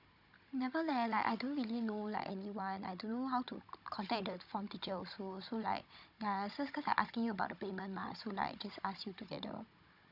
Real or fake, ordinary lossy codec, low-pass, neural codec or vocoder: fake; none; 5.4 kHz; codec, 16 kHz, 16 kbps, FunCodec, trained on Chinese and English, 50 frames a second